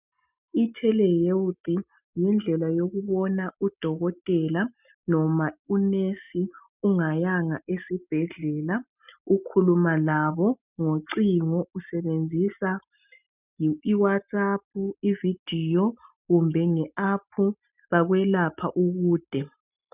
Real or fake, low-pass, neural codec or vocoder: real; 3.6 kHz; none